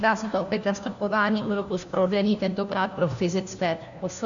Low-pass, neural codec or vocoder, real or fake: 7.2 kHz; codec, 16 kHz, 1 kbps, FunCodec, trained on LibriTTS, 50 frames a second; fake